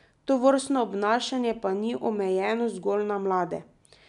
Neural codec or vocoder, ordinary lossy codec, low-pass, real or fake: none; none; 10.8 kHz; real